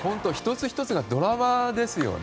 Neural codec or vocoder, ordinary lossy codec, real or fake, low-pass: none; none; real; none